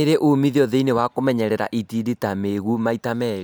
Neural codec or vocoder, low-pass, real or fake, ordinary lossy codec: none; none; real; none